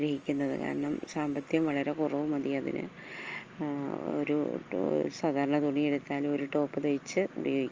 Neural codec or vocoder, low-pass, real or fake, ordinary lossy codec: none; 7.2 kHz; real; Opus, 24 kbps